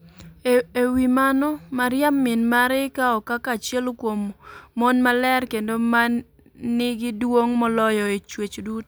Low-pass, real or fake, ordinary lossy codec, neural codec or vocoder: none; real; none; none